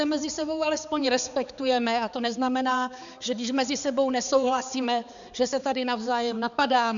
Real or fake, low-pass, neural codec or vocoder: fake; 7.2 kHz; codec, 16 kHz, 4 kbps, X-Codec, HuBERT features, trained on balanced general audio